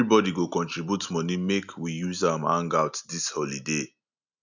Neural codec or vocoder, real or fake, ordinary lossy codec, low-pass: none; real; none; 7.2 kHz